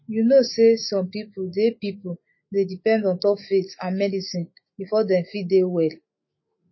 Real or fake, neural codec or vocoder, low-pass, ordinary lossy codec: fake; autoencoder, 48 kHz, 128 numbers a frame, DAC-VAE, trained on Japanese speech; 7.2 kHz; MP3, 24 kbps